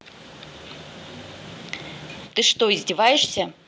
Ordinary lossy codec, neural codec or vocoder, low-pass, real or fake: none; none; none; real